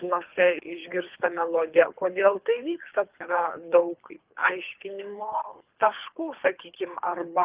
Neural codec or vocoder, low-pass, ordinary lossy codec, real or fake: codec, 24 kHz, 3 kbps, HILCodec; 3.6 kHz; Opus, 32 kbps; fake